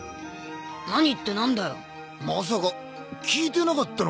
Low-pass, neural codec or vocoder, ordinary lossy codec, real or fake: none; none; none; real